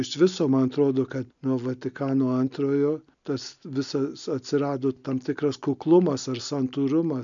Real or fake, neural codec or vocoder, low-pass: real; none; 7.2 kHz